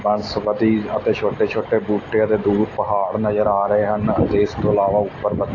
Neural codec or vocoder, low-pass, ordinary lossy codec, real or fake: none; 7.2 kHz; AAC, 32 kbps; real